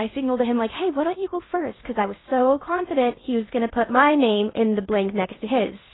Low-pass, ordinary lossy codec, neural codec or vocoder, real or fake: 7.2 kHz; AAC, 16 kbps; codec, 16 kHz in and 24 kHz out, 0.6 kbps, FocalCodec, streaming, 2048 codes; fake